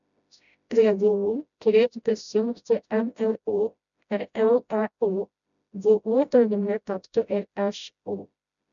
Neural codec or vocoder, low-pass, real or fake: codec, 16 kHz, 0.5 kbps, FreqCodec, smaller model; 7.2 kHz; fake